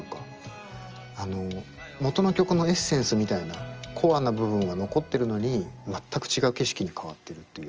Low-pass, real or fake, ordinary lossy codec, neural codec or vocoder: 7.2 kHz; real; Opus, 24 kbps; none